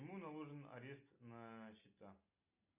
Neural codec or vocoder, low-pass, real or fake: none; 3.6 kHz; real